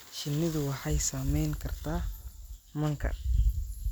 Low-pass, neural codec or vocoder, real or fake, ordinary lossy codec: none; none; real; none